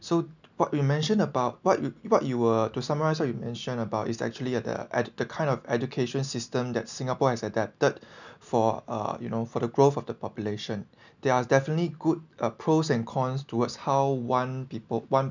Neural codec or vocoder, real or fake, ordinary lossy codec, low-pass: none; real; none; 7.2 kHz